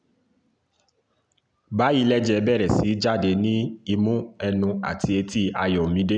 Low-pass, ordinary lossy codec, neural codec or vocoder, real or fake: 9.9 kHz; none; none; real